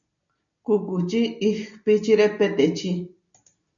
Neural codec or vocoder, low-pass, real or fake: none; 7.2 kHz; real